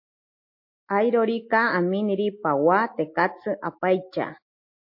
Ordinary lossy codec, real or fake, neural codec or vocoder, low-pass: MP3, 32 kbps; real; none; 5.4 kHz